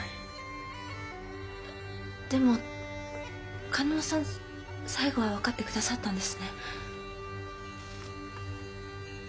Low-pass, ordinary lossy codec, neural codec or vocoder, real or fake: none; none; none; real